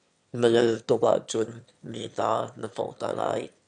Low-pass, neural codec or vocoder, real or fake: 9.9 kHz; autoencoder, 22.05 kHz, a latent of 192 numbers a frame, VITS, trained on one speaker; fake